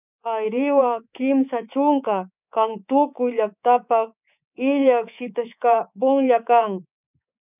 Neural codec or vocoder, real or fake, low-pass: codec, 24 kHz, 3.1 kbps, DualCodec; fake; 3.6 kHz